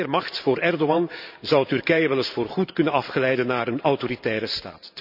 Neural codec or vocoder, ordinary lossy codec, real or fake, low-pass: vocoder, 44.1 kHz, 128 mel bands every 512 samples, BigVGAN v2; none; fake; 5.4 kHz